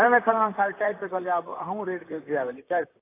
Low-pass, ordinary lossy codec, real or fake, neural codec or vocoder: 3.6 kHz; AAC, 24 kbps; fake; vocoder, 44.1 kHz, 128 mel bands, Pupu-Vocoder